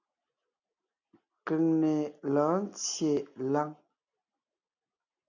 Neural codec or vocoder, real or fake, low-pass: none; real; 7.2 kHz